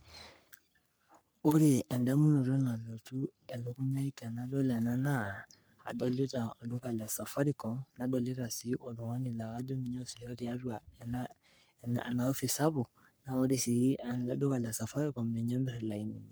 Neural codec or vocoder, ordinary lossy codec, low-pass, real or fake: codec, 44.1 kHz, 3.4 kbps, Pupu-Codec; none; none; fake